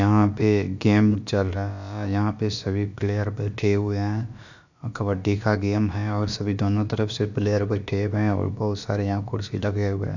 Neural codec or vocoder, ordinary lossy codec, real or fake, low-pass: codec, 16 kHz, about 1 kbps, DyCAST, with the encoder's durations; none; fake; 7.2 kHz